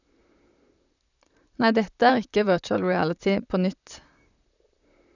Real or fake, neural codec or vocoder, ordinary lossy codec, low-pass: fake; vocoder, 44.1 kHz, 128 mel bands, Pupu-Vocoder; none; 7.2 kHz